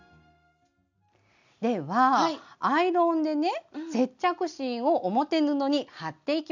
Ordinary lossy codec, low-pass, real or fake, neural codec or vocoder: none; 7.2 kHz; real; none